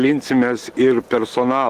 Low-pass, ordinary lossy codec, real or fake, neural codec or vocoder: 14.4 kHz; Opus, 16 kbps; real; none